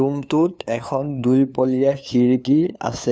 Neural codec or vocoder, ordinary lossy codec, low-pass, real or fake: codec, 16 kHz, 2 kbps, FunCodec, trained on LibriTTS, 25 frames a second; none; none; fake